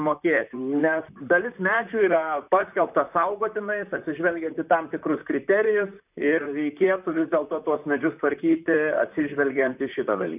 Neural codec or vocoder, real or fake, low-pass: vocoder, 44.1 kHz, 128 mel bands, Pupu-Vocoder; fake; 3.6 kHz